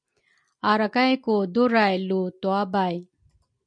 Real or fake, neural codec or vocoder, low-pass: real; none; 9.9 kHz